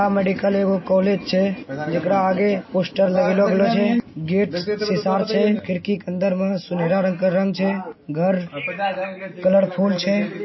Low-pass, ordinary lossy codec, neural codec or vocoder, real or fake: 7.2 kHz; MP3, 24 kbps; none; real